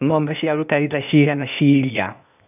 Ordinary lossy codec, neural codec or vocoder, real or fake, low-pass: none; codec, 16 kHz, 0.8 kbps, ZipCodec; fake; 3.6 kHz